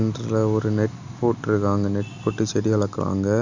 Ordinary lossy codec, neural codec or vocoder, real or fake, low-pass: Opus, 64 kbps; none; real; 7.2 kHz